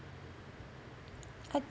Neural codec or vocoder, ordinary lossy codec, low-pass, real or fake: none; none; none; real